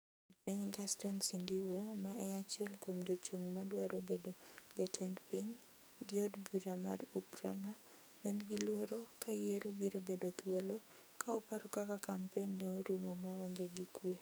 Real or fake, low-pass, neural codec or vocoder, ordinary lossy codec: fake; none; codec, 44.1 kHz, 2.6 kbps, SNAC; none